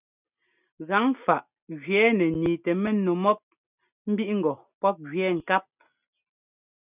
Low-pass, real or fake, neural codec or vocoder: 3.6 kHz; real; none